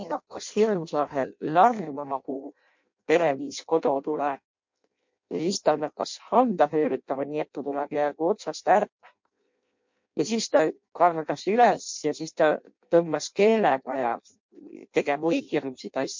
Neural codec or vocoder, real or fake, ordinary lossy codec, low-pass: codec, 16 kHz in and 24 kHz out, 0.6 kbps, FireRedTTS-2 codec; fake; MP3, 48 kbps; 7.2 kHz